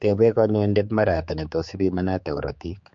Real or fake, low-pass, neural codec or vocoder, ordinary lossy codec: fake; 7.2 kHz; codec, 16 kHz, 4 kbps, X-Codec, HuBERT features, trained on general audio; MP3, 48 kbps